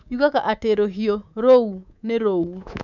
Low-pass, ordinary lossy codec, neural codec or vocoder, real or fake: 7.2 kHz; none; codec, 24 kHz, 3.1 kbps, DualCodec; fake